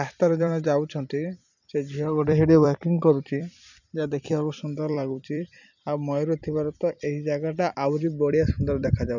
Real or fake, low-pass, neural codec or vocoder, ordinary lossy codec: fake; 7.2 kHz; vocoder, 44.1 kHz, 128 mel bands every 256 samples, BigVGAN v2; none